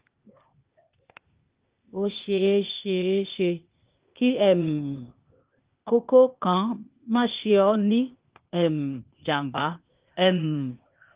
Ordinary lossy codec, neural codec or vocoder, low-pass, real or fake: Opus, 32 kbps; codec, 16 kHz, 0.8 kbps, ZipCodec; 3.6 kHz; fake